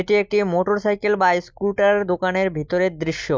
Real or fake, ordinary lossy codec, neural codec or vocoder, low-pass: real; none; none; none